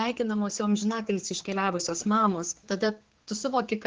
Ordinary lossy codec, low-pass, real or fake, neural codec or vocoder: Opus, 16 kbps; 7.2 kHz; fake; codec, 16 kHz, 4 kbps, X-Codec, HuBERT features, trained on general audio